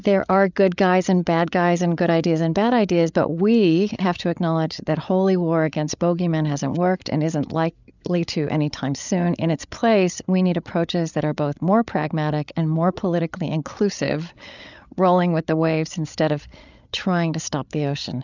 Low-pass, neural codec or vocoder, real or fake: 7.2 kHz; codec, 16 kHz, 8 kbps, FreqCodec, larger model; fake